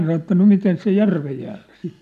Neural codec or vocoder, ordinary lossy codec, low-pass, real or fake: none; none; 14.4 kHz; real